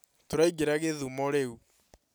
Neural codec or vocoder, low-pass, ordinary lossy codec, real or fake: none; none; none; real